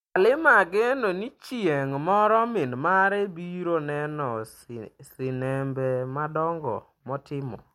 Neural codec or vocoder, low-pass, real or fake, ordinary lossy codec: none; 19.8 kHz; real; MP3, 64 kbps